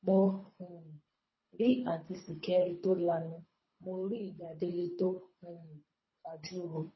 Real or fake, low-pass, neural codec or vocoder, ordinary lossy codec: fake; 7.2 kHz; codec, 24 kHz, 3 kbps, HILCodec; MP3, 24 kbps